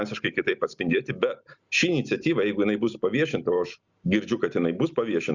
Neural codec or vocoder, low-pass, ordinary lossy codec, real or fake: none; 7.2 kHz; Opus, 64 kbps; real